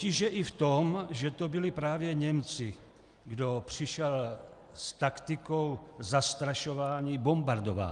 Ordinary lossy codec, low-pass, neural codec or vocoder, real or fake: Opus, 32 kbps; 10.8 kHz; none; real